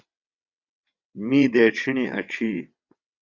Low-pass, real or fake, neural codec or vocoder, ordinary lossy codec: 7.2 kHz; fake; vocoder, 22.05 kHz, 80 mel bands, WaveNeXt; Opus, 64 kbps